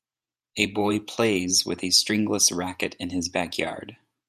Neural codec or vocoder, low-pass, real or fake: vocoder, 48 kHz, 128 mel bands, Vocos; 14.4 kHz; fake